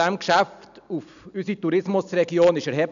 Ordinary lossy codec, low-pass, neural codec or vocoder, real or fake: none; 7.2 kHz; none; real